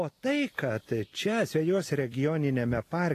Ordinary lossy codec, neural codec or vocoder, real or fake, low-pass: AAC, 48 kbps; none; real; 14.4 kHz